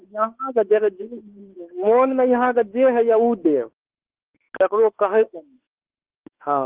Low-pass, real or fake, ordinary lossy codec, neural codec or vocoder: 3.6 kHz; fake; Opus, 16 kbps; autoencoder, 48 kHz, 32 numbers a frame, DAC-VAE, trained on Japanese speech